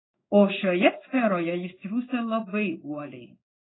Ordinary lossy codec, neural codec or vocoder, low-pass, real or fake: AAC, 16 kbps; codec, 16 kHz in and 24 kHz out, 1 kbps, XY-Tokenizer; 7.2 kHz; fake